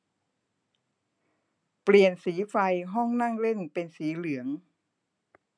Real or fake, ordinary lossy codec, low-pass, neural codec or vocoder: real; none; 9.9 kHz; none